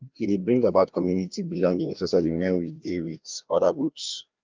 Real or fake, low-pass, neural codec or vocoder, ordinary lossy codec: fake; 7.2 kHz; codec, 16 kHz, 1 kbps, FreqCodec, larger model; Opus, 24 kbps